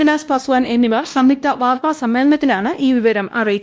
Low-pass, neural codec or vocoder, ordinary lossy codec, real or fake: none; codec, 16 kHz, 1 kbps, X-Codec, WavLM features, trained on Multilingual LibriSpeech; none; fake